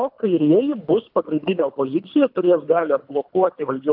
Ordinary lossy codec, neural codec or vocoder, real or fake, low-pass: AAC, 48 kbps; codec, 24 kHz, 3 kbps, HILCodec; fake; 5.4 kHz